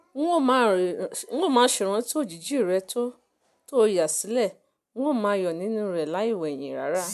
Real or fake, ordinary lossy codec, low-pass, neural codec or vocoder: real; MP3, 96 kbps; 14.4 kHz; none